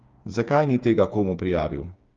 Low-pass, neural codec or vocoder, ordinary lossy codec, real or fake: 7.2 kHz; codec, 16 kHz, 4 kbps, FreqCodec, smaller model; Opus, 32 kbps; fake